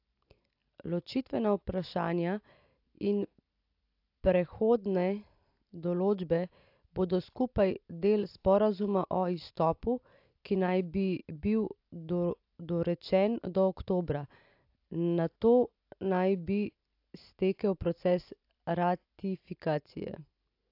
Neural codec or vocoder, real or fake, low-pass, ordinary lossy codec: none; real; 5.4 kHz; AAC, 48 kbps